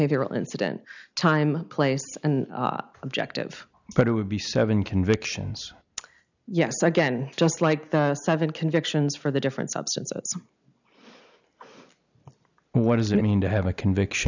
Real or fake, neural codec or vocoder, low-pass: real; none; 7.2 kHz